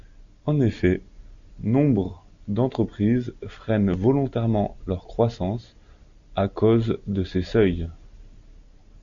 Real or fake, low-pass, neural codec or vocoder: real; 7.2 kHz; none